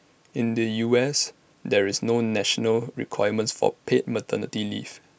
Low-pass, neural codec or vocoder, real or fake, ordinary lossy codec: none; none; real; none